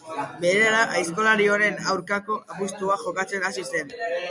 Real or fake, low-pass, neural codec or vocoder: real; 10.8 kHz; none